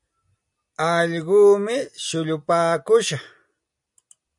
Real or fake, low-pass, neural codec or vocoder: real; 10.8 kHz; none